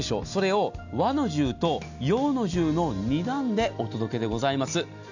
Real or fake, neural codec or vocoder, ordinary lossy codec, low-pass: real; none; none; 7.2 kHz